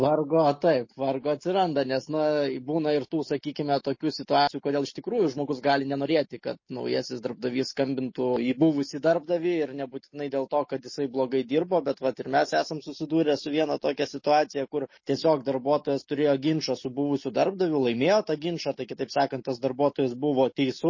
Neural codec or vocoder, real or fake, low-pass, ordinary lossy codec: none; real; 7.2 kHz; MP3, 32 kbps